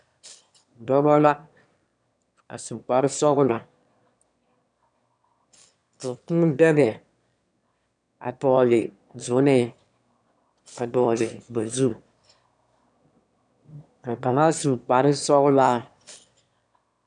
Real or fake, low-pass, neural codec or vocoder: fake; 9.9 kHz; autoencoder, 22.05 kHz, a latent of 192 numbers a frame, VITS, trained on one speaker